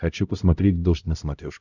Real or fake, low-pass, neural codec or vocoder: fake; 7.2 kHz; codec, 16 kHz, 0.5 kbps, X-Codec, HuBERT features, trained on LibriSpeech